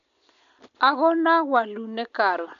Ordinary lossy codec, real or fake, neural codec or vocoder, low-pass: none; real; none; 7.2 kHz